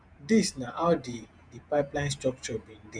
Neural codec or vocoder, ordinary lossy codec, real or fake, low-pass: vocoder, 48 kHz, 128 mel bands, Vocos; none; fake; 9.9 kHz